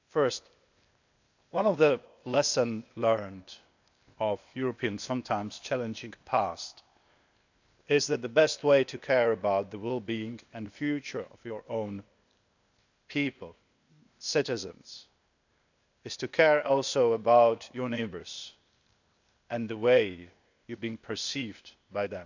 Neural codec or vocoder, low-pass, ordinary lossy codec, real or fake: codec, 16 kHz, 0.8 kbps, ZipCodec; 7.2 kHz; none; fake